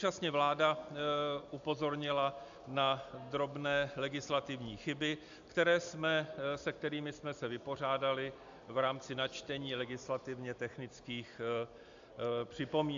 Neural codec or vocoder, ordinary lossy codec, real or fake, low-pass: none; AAC, 64 kbps; real; 7.2 kHz